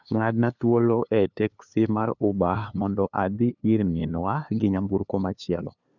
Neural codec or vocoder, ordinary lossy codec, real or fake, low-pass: codec, 16 kHz, 2 kbps, FunCodec, trained on LibriTTS, 25 frames a second; none; fake; 7.2 kHz